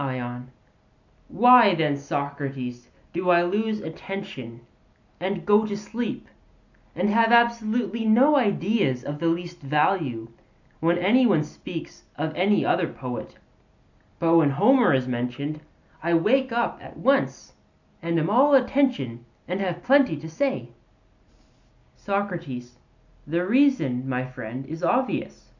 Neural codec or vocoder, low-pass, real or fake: none; 7.2 kHz; real